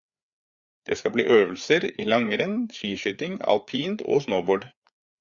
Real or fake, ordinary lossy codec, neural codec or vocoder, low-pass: fake; Opus, 64 kbps; codec, 16 kHz, 4 kbps, FreqCodec, larger model; 7.2 kHz